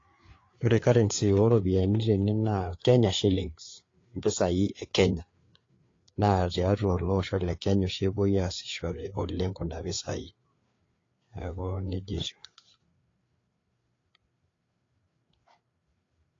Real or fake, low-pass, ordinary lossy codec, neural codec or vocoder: fake; 7.2 kHz; AAC, 32 kbps; codec, 16 kHz, 4 kbps, FreqCodec, larger model